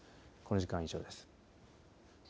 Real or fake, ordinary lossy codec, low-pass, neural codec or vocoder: real; none; none; none